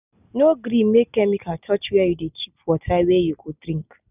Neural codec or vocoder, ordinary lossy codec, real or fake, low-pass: none; none; real; 3.6 kHz